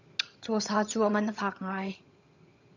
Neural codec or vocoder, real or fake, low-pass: vocoder, 22.05 kHz, 80 mel bands, HiFi-GAN; fake; 7.2 kHz